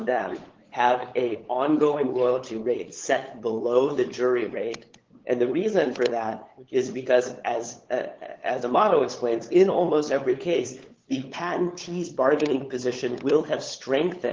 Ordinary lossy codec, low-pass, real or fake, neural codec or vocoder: Opus, 16 kbps; 7.2 kHz; fake; codec, 16 kHz, 8 kbps, FunCodec, trained on LibriTTS, 25 frames a second